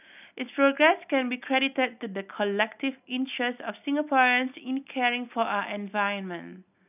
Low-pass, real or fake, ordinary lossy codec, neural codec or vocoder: 3.6 kHz; real; none; none